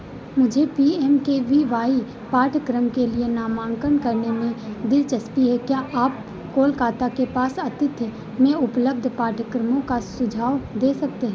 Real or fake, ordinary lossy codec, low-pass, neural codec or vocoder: real; none; none; none